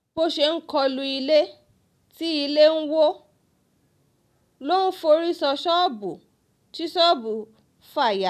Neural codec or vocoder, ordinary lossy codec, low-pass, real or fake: none; none; 14.4 kHz; real